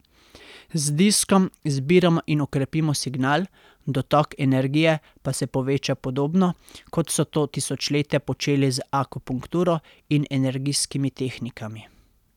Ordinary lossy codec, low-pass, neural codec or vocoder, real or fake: none; 19.8 kHz; none; real